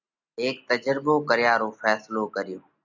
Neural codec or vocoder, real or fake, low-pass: none; real; 7.2 kHz